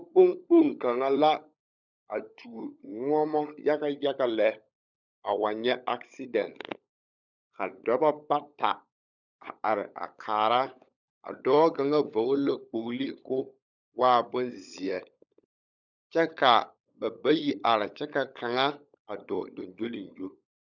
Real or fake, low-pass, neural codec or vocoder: fake; 7.2 kHz; codec, 16 kHz, 16 kbps, FunCodec, trained on LibriTTS, 50 frames a second